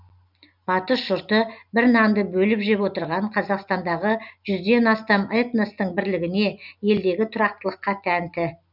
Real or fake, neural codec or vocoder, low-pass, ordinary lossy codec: real; none; 5.4 kHz; none